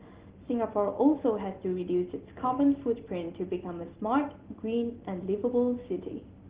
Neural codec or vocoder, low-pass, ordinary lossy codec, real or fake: none; 3.6 kHz; Opus, 16 kbps; real